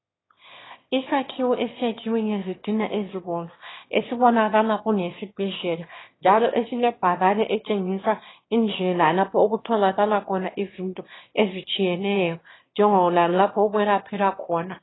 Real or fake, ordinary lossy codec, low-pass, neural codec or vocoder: fake; AAC, 16 kbps; 7.2 kHz; autoencoder, 22.05 kHz, a latent of 192 numbers a frame, VITS, trained on one speaker